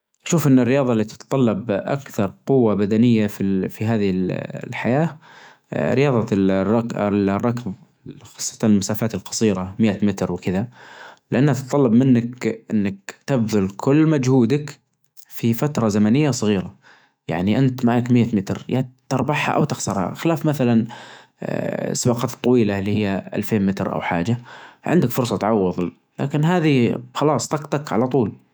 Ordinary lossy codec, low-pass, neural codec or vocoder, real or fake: none; none; autoencoder, 48 kHz, 128 numbers a frame, DAC-VAE, trained on Japanese speech; fake